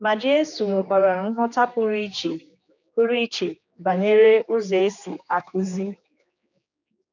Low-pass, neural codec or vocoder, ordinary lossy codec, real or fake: 7.2 kHz; codec, 16 kHz, 2 kbps, X-Codec, HuBERT features, trained on general audio; none; fake